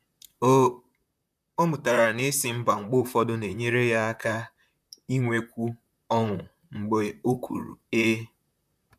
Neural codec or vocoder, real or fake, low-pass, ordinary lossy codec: vocoder, 44.1 kHz, 128 mel bands, Pupu-Vocoder; fake; 14.4 kHz; none